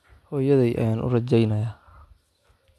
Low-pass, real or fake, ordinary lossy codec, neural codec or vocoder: none; real; none; none